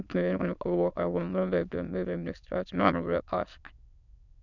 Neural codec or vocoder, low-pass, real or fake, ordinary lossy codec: autoencoder, 22.05 kHz, a latent of 192 numbers a frame, VITS, trained on many speakers; 7.2 kHz; fake; none